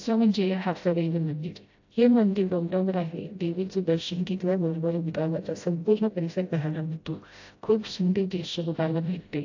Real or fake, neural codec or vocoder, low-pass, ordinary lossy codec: fake; codec, 16 kHz, 0.5 kbps, FreqCodec, smaller model; 7.2 kHz; AAC, 48 kbps